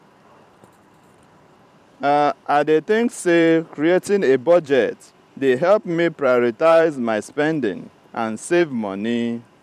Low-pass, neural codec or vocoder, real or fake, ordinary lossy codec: 14.4 kHz; vocoder, 44.1 kHz, 128 mel bands every 512 samples, BigVGAN v2; fake; none